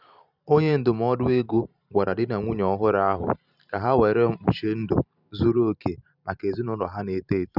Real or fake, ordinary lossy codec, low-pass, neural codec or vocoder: fake; none; 5.4 kHz; vocoder, 44.1 kHz, 128 mel bands every 512 samples, BigVGAN v2